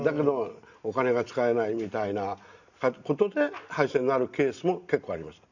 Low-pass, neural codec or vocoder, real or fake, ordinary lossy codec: 7.2 kHz; none; real; none